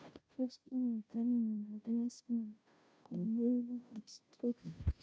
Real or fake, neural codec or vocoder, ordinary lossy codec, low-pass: fake; codec, 16 kHz, 0.5 kbps, FunCodec, trained on Chinese and English, 25 frames a second; none; none